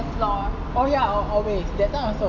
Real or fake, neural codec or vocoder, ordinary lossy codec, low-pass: real; none; none; 7.2 kHz